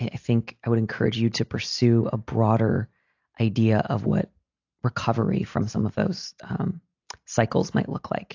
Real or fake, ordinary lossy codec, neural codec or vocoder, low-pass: real; AAC, 48 kbps; none; 7.2 kHz